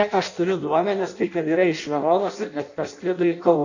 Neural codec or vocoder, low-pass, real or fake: codec, 16 kHz in and 24 kHz out, 0.6 kbps, FireRedTTS-2 codec; 7.2 kHz; fake